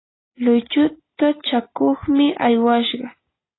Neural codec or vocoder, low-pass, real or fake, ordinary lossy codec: none; 7.2 kHz; real; AAC, 16 kbps